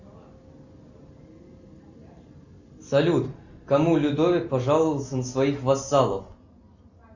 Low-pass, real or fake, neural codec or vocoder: 7.2 kHz; real; none